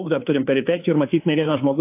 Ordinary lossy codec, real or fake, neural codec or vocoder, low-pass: AAC, 24 kbps; fake; codec, 16 kHz, 2 kbps, FunCodec, trained on Chinese and English, 25 frames a second; 3.6 kHz